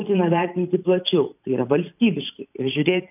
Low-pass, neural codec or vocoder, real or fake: 3.6 kHz; vocoder, 44.1 kHz, 128 mel bands every 512 samples, BigVGAN v2; fake